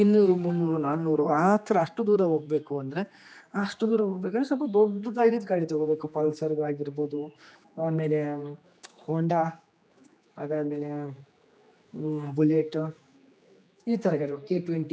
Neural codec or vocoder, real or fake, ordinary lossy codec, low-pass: codec, 16 kHz, 2 kbps, X-Codec, HuBERT features, trained on general audio; fake; none; none